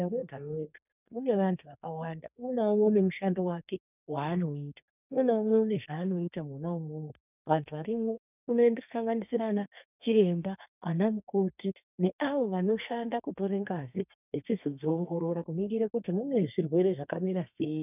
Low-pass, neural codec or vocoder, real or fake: 3.6 kHz; codec, 32 kHz, 1.9 kbps, SNAC; fake